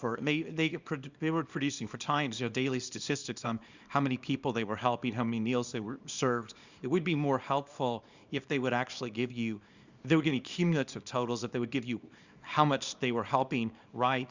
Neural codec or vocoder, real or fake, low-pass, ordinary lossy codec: codec, 24 kHz, 0.9 kbps, WavTokenizer, small release; fake; 7.2 kHz; Opus, 64 kbps